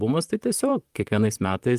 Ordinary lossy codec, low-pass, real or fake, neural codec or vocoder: Opus, 32 kbps; 14.4 kHz; fake; vocoder, 44.1 kHz, 128 mel bands, Pupu-Vocoder